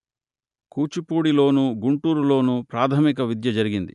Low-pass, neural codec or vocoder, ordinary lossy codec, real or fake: 10.8 kHz; none; none; real